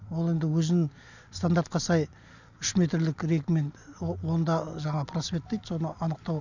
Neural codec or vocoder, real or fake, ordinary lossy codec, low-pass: none; real; none; 7.2 kHz